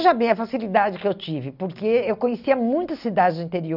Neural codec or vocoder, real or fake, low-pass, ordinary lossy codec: none; real; 5.4 kHz; none